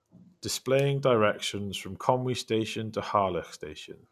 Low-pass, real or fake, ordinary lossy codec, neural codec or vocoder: 14.4 kHz; real; none; none